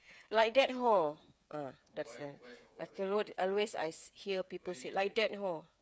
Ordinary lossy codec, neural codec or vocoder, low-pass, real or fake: none; codec, 16 kHz, 8 kbps, FreqCodec, smaller model; none; fake